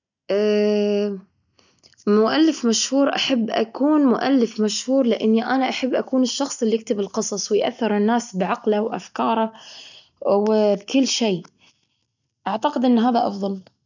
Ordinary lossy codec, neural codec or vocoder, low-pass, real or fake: none; none; 7.2 kHz; real